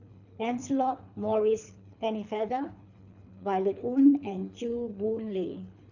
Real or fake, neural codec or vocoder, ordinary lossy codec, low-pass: fake; codec, 24 kHz, 3 kbps, HILCodec; none; 7.2 kHz